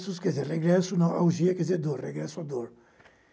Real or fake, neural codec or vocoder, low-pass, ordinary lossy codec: real; none; none; none